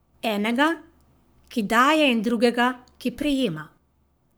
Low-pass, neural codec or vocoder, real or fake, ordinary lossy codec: none; codec, 44.1 kHz, 7.8 kbps, Pupu-Codec; fake; none